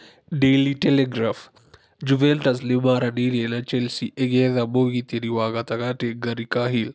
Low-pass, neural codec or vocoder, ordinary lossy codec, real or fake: none; none; none; real